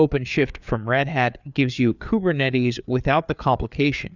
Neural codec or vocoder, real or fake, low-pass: codec, 16 kHz, 4 kbps, FreqCodec, larger model; fake; 7.2 kHz